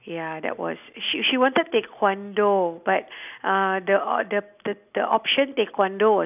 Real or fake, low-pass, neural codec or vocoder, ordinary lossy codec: real; 3.6 kHz; none; none